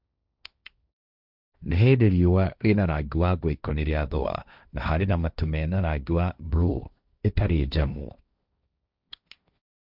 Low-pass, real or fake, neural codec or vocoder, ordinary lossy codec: 5.4 kHz; fake; codec, 16 kHz, 1.1 kbps, Voila-Tokenizer; none